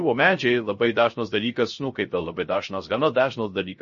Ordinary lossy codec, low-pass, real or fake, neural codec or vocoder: MP3, 32 kbps; 7.2 kHz; fake; codec, 16 kHz, 0.3 kbps, FocalCodec